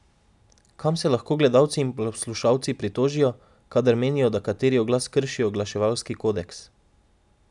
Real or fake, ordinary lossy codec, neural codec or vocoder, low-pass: real; none; none; 10.8 kHz